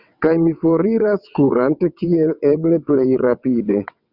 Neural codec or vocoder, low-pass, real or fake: vocoder, 22.05 kHz, 80 mel bands, WaveNeXt; 5.4 kHz; fake